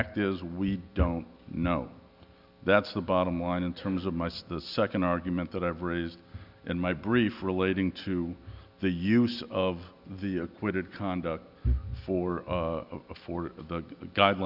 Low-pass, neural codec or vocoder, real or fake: 5.4 kHz; none; real